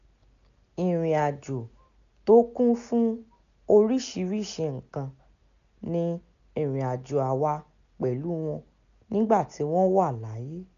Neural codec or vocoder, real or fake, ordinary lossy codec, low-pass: none; real; none; 7.2 kHz